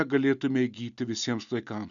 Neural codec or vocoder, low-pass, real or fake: none; 7.2 kHz; real